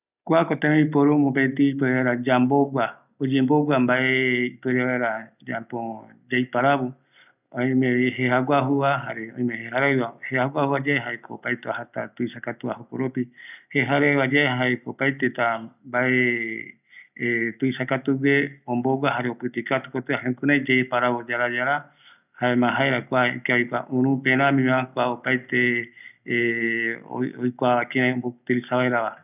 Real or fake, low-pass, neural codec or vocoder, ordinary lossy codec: real; 3.6 kHz; none; none